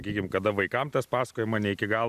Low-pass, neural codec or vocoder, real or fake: 14.4 kHz; none; real